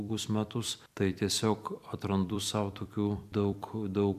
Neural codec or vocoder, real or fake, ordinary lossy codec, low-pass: vocoder, 44.1 kHz, 128 mel bands every 256 samples, BigVGAN v2; fake; MP3, 96 kbps; 14.4 kHz